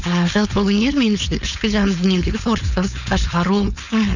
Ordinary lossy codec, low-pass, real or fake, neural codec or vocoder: none; 7.2 kHz; fake; codec, 16 kHz, 4.8 kbps, FACodec